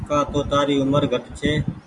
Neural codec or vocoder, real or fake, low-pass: none; real; 10.8 kHz